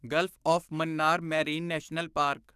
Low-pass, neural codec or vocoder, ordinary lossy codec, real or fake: 14.4 kHz; codec, 44.1 kHz, 7.8 kbps, DAC; none; fake